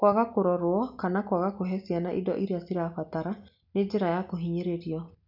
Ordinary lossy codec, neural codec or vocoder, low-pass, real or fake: none; none; 5.4 kHz; real